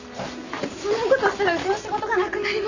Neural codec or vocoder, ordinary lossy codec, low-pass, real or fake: none; none; 7.2 kHz; real